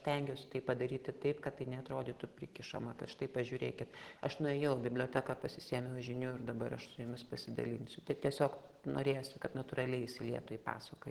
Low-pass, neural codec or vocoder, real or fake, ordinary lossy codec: 19.8 kHz; none; real; Opus, 16 kbps